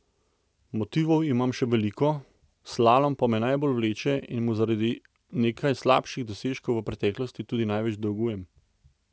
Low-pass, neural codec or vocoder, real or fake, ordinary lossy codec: none; none; real; none